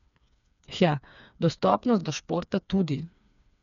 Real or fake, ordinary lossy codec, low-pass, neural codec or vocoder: fake; none; 7.2 kHz; codec, 16 kHz, 4 kbps, FreqCodec, smaller model